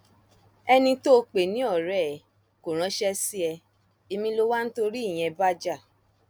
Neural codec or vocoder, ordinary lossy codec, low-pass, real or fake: none; none; none; real